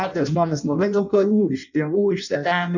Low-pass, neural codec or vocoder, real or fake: 7.2 kHz; codec, 16 kHz in and 24 kHz out, 0.6 kbps, FireRedTTS-2 codec; fake